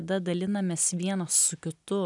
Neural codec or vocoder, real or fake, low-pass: none; real; 10.8 kHz